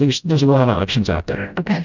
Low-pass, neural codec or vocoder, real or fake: 7.2 kHz; codec, 16 kHz, 0.5 kbps, FreqCodec, smaller model; fake